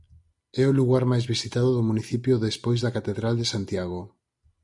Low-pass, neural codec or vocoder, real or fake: 10.8 kHz; none; real